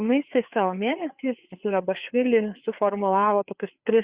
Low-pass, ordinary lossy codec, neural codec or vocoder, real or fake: 3.6 kHz; Opus, 64 kbps; codec, 16 kHz, 4 kbps, FreqCodec, larger model; fake